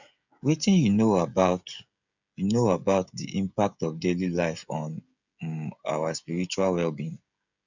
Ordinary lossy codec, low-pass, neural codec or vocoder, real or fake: none; 7.2 kHz; codec, 16 kHz, 16 kbps, FreqCodec, smaller model; fake